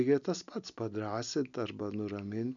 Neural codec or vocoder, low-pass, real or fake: none; 7.2 kHz; real